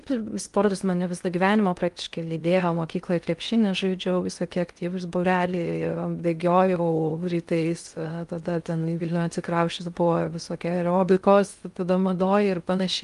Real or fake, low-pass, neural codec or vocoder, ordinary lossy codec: fake; 10.8 kHz; codec, 16 kHz in and 24 kHz out, 0.6 kbps, FocalCodec, streaming, 2048 codes; Opus, 32 kbps